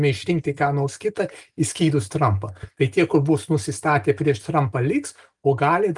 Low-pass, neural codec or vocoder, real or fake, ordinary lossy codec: 10.8 kHz; none; real; Opus, 32 kbps